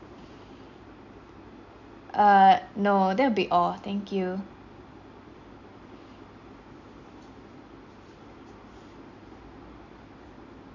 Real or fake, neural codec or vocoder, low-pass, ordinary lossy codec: real; none; 7.2 kHz; none